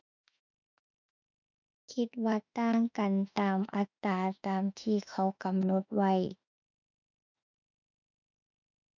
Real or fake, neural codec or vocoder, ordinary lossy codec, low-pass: fake; autoencoder, 48 kHz, 32 numbers a frame, DAC-VAE, trained on Japanese speech; none; 7.2 kHz